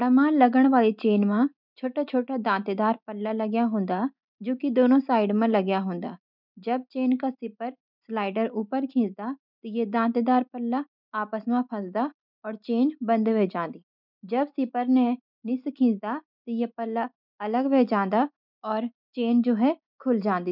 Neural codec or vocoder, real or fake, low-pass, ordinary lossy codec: none; real; 5.4 kHz; none